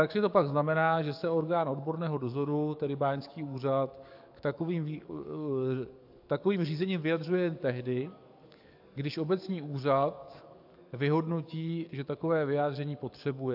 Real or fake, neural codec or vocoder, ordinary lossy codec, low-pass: fake; codec, 44.1 kHz, 7.8 kbps, DAC; AAC, 48 kbps; 5.4 kHz